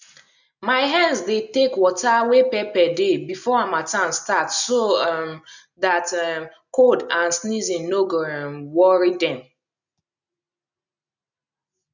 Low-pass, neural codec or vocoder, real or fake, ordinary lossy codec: 7.2 kHz; none; real; none